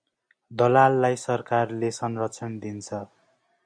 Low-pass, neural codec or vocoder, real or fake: 9.9 kHz; none; real